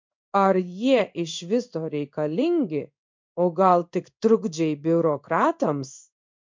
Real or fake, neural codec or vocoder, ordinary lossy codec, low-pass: fake; codec, 16 kHz in and 24 kHz out, 1 kbps, XY-Tokenizer; MP3, 64 kbps; 7.2 kHz